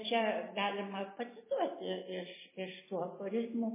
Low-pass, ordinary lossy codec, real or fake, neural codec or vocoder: 3.6 kHz; MP3, 16 kbps; real; none